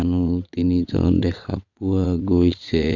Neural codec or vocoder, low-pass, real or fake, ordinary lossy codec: vocoder, 22.05 kHz, 80 mel bands, WaveNeXt; 7.2 kHz; fake; none